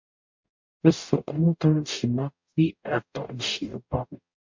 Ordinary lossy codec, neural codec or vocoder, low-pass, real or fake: MP3, 48 kbps; codec, 44.1 kHz, 0.9 kbps, DAC; 7.2 kHz; fake